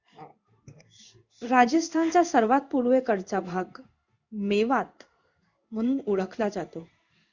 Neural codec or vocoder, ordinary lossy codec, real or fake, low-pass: codec, 16 kHz in and 24 kHz out, 1 kbps, XY-Tokenizer; Opus, 64 kbps; fake; 7.2 kHz